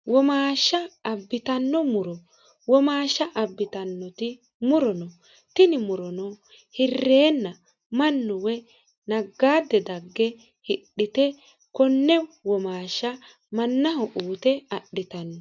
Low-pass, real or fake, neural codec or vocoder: 7.2 kHz; real; none